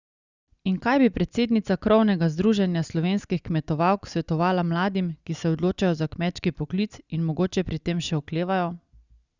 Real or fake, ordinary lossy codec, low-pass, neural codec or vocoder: real; Opus, 64 kbps; 7.2 kHz; none